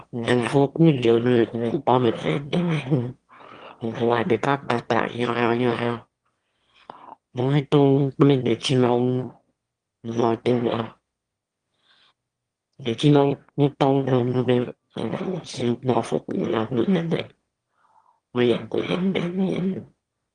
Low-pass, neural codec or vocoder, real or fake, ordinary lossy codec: 9.9 kHz; autoencoder, 22.05 kHz, a latent of 192 numbers a frame, VITS, trained on one speaker; fake; Opus, 24 kbps